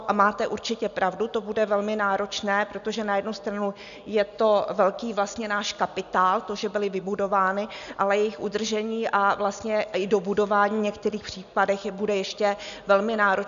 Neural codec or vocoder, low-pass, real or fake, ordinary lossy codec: none; 7.2 kHz; real; MP3, 96 kbps